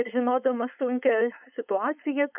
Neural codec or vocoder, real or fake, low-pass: codec, 16 kHz, 4.8 kbps, FACodec; fake; 3.6 kHz